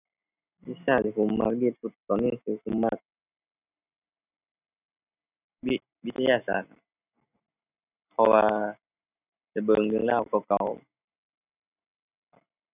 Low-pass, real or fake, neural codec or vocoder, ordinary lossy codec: 3.6 kHz; real; none; none